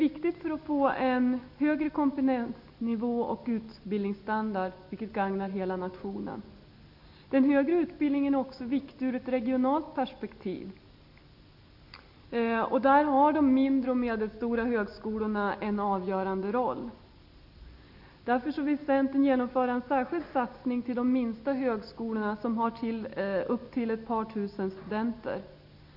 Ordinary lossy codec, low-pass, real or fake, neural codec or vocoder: none; 5.4 kHz; real; none